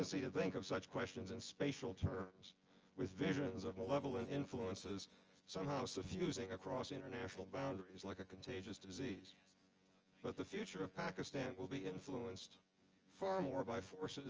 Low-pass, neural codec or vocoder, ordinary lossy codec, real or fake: 7.2 kHz; vocoder, 24 kHz, 100 mel bands, Vocos; Opus, 24 kbps; fake